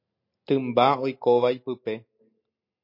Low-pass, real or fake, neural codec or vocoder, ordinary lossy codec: 5.4 kHz; real; none; MP3, 32 kbps